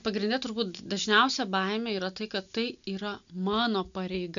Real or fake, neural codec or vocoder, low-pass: real; none; 7.2 kHz